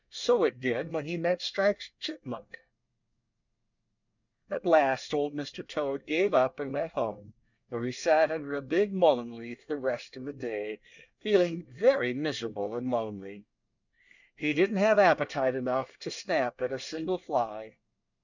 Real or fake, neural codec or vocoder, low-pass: fake; codec, 24 kHz, 1 kbps, SNAC; 7.2 kHz